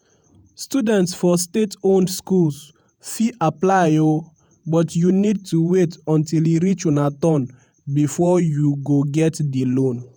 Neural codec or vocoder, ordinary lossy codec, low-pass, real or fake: vocoder, 48 kHz, 128 mel bands, Vocos; none; none; fake